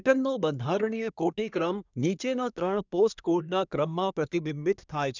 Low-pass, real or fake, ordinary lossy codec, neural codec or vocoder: 7.2 kHz; fake; none; codec, 24 kHz, 1 kbps, SNAC